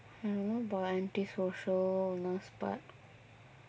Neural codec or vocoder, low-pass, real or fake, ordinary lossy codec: none; none; real; none